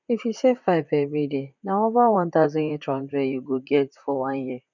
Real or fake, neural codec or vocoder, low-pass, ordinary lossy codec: fake; vocoder, 44.1 kHz, 128 mel bands, Pupu-Vocoder; 7.2 kHz; none